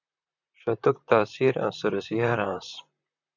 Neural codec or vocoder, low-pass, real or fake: vocoder, 44.1 kHz, 128 mel bands, Pupu-Vocoder; 7.2 kHz; fake